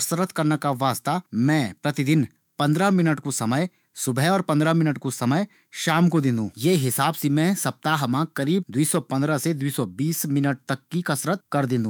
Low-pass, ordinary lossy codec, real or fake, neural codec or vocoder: none; none; fake; autoencoder, 48 kHz, 128 numbers a frame, DAC-VAE, trained on Japanese speech